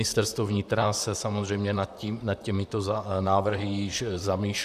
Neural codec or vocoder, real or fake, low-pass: vocoder, 44.1 kHz, 128 mel bands, Pupu-Vocoder; fake; 14.4 kHz